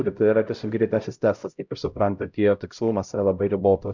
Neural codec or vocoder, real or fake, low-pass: codec, 16 kHz, 0.5 kbps, X-Codec, HuBERT features, trained on LibriSpeech; fake; 7.2 kHz